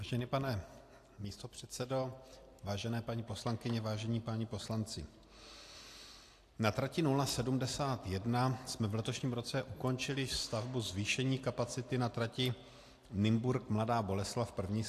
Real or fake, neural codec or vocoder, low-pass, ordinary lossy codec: real; none; 14.4 kHz; AAC, 64 kbps